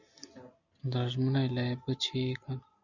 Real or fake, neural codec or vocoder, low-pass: real; none; 7.2 kHz